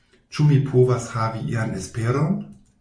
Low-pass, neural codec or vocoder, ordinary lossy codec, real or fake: 9.9 kHz; none; MP3, 48 kbps; real